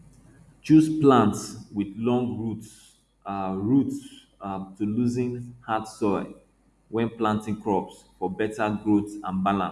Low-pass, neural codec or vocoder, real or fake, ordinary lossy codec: none; none; real; none